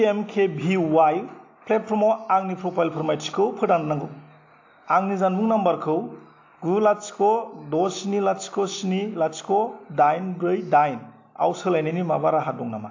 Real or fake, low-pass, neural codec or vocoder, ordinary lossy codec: real; 7.2 kHz; none; AAC, 48 kbps